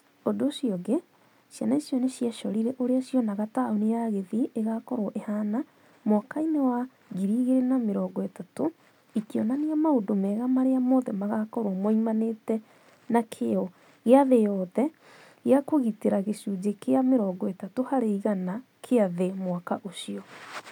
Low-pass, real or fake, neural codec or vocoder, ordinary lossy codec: 19.8 kHz; real; none; none